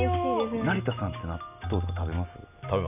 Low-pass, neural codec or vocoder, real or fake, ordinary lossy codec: 3.6 kHz; none; real; none